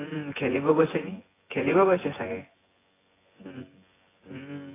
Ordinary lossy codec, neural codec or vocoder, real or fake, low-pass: AAC, 16 kbps; vocoder, 24 kHz, 100 mel bands, Vocos; fake; 3.6 kHz